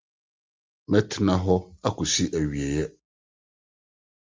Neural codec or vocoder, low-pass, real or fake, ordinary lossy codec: none; 7.2 kHz; real; Opus, 32 kbps